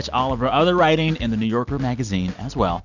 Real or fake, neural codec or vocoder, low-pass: real; none; 7.2 kHz